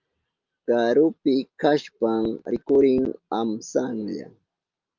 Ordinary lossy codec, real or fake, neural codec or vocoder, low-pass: Opus, 24 kbps; real; none; 7.2 kHz